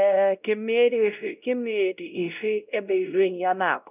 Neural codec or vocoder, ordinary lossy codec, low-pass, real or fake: codec, 16 kHz, 0.5 kbps, X-Codec, WavLM features, trained on Multilingual LibriSpeech; none; 3.6 kHz; fake